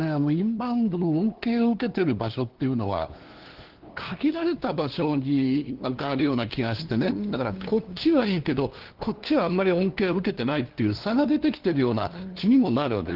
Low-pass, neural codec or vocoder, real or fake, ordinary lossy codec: 5.4 kHz; codec, 16 kHz, 2 kbps, FunCodec, trained on LibriTTS, 25 frames a second; fake; Opus, 16 kbps